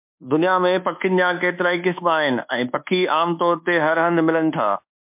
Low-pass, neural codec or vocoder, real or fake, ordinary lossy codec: 3.6 kHz; codec, 24 kHz, 1.2 kbps, DualCodec; fake; MP3, 32 kbps